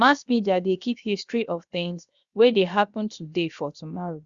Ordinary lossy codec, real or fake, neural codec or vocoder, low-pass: Opus, 64 kbps; fake; codec, 16 kHz, 0.7 kbps, FocalCodec; 7.2 kHz